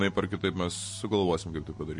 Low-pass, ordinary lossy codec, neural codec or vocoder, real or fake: 10.8 kHz; MP3, 48 kbps; none; real